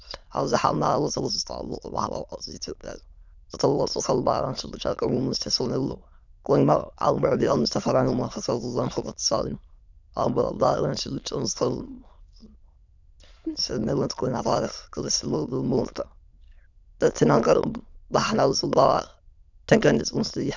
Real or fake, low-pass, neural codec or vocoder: fake; 7.2 kHz; autoencoder, 22.05 kHz, a latent of 192 numbers a frame, VITS, trained on many speakers